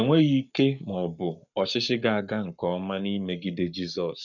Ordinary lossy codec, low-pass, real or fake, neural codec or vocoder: none; 7.2 kHz; fake; codec, 44.1 kHz, 7.8 kbps, Pupu-Codec